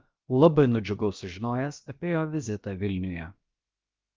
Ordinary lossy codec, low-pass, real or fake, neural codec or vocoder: Opus, 24 kbps; 7.2 kHz; fake; codec, 16 kHz, about 1 kbps, DyCAST, with the encoder's durations